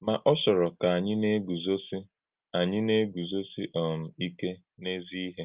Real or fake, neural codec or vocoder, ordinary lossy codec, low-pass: real; none; Opus, 32 kbps; 3.6 kHz